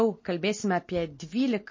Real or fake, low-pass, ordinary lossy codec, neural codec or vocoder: real; 7.2 kHz; MP3, 32 kbps; none